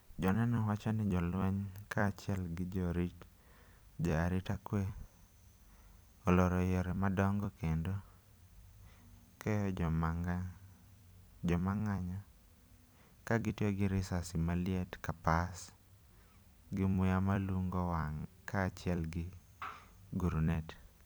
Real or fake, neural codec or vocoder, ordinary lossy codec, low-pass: fake; vocoder, 44.1 kHz, 128 mel bands every 256 samples, BigVGAN v2; none; none